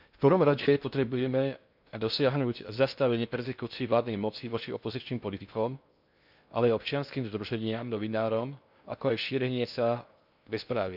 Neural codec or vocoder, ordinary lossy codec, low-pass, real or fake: codec, 16 kHz in and 24 kHz out, 0.6 kbps, FocalCodec, streaming, 2048 codes; none; 5.4 kHz; fake